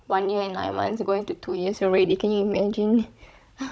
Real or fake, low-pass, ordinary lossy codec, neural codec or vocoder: fake; none; none; codec, 16 kHz, 16 kbps, FunCodec, trained on Chinese and English, 50 frames a second